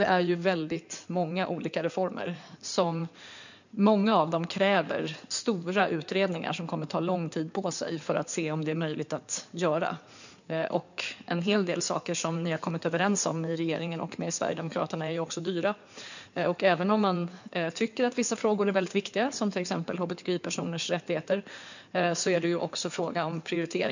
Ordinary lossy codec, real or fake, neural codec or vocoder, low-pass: none; fake; codec, 16 kHz in and 24 kHz out, 2.2 kbps, FireRedTTS-2 codec; 7.2 kHz